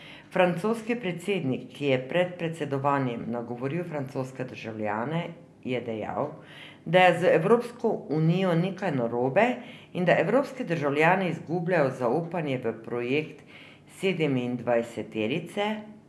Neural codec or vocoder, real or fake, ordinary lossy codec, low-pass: none; real; none; none